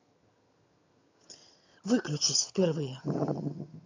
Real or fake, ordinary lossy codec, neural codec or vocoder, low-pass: fake; AAC, 48 kbps; vocoder, 22.05 kHz, 80 mel bands, HiFi-GAN; 7.2 kHz